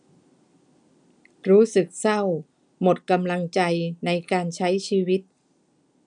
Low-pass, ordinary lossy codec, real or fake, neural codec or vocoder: 9.9 kHz; none; real; none